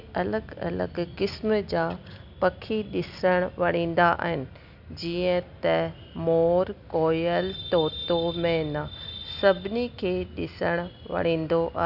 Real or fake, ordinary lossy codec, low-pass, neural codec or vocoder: real; none; 5.4 kHz; none